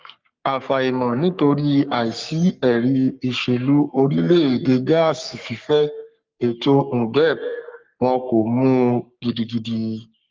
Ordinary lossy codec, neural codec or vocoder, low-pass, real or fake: Opus, 32 kbps; codec, 44.1 kHz, 3.4 kbps, Pupu-Codec; 7.2 kHz; fake